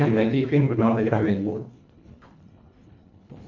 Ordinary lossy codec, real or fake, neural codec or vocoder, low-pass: AAC, 48 kbps; fake; codec, 24 kHz, 1.5 kbps, HILCodec; 7.2 kHz